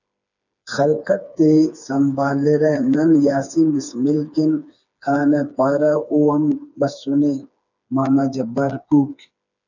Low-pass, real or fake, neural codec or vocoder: 7.2 kHz; fake; codec, 16 kHz, 4 kbps, FreqCodec, smaller model